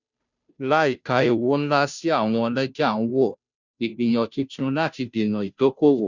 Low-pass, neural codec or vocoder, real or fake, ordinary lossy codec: 7.2 kHz; codec, 16 kHz, 0.5 kbps, FunCodec, trained on Chinese and English, 25 frames a second; fake; none